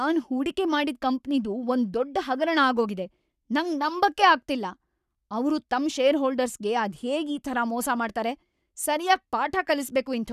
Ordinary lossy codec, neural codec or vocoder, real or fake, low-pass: none; codec, 44.1 kHz, 7.8 kbps, Pupu-Codec; fake; 14.4 kHz